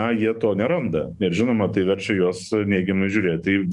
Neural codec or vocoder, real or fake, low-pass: codec, 44.1 kHz, 7.8 kbps, DAC; fake; 10.8 kHz